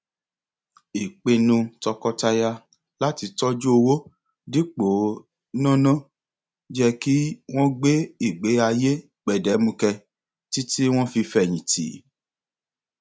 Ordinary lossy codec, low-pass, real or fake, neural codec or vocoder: none; none; real; none